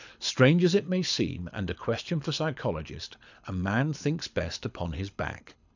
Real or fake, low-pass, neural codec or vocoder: fake; 7.2 kHz; codec, 24 kHz, 6 kbps, HILCodec